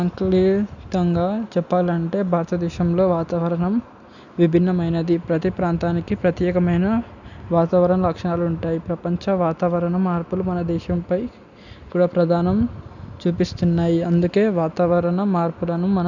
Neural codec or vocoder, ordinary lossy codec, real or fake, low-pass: none; none; real; 7.2 kHz